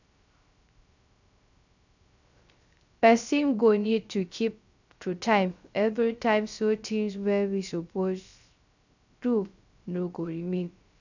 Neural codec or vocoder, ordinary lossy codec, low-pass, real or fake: codec, 16 kHz, 0.3 kbps, FocalCodec; none; 7.2 kHz; fake